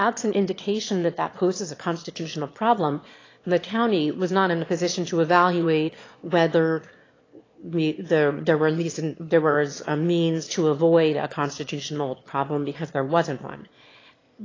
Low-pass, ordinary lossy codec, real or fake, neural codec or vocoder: 7.2 kHz; AAC, 32 kbps; fake; autoencoder, 22.05 kHz, a latent of 192 numbers a frame, VITS, trained on one speaker